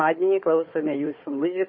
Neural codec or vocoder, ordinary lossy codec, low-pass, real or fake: codec, 16 kHz, 4 kbps, FunCodec, trained on Chinese and English, 50 frames a second; MP3, 24 kbps; 7.2 kHz; fake